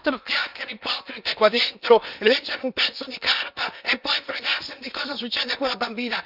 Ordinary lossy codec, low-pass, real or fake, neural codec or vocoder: none; 5.4 kHz; fake; codec, 16 kHz in and 24 kHz out, 0.8 kbps, FocalCodec, streaming, 65536 codes